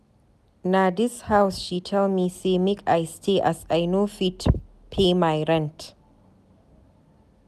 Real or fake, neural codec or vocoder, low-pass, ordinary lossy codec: real; none; 14.4 kHz; none